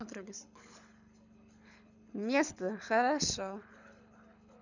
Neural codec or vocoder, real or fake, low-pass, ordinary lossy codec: codec, 24 kHz, 6 kbps, HILCodec; fake; 7.2 kHz; none